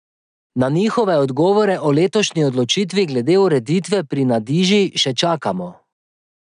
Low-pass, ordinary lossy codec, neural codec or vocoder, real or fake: 9.9 kHz; none; none; real